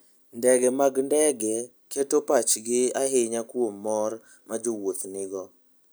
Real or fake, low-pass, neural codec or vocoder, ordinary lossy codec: real; none; none; none